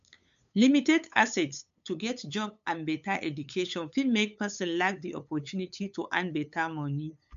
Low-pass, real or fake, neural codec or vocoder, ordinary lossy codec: 7.2 kHz; fake; codec, 16 kHz, 8 kbps, FunCodec, trained on LibriTTS, 25 frames a second; MP3, 64 kbps